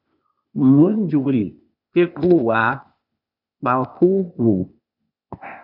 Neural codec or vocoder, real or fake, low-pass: codec, 16 kHz, 0.8 kbps, ZipCodec; fake; 5.4 kHz